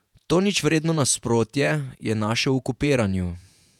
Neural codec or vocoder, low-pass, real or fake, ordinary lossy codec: vocoder, 48 kHz, 128 mel bands, Vocos; 19.8 kHz; fake; none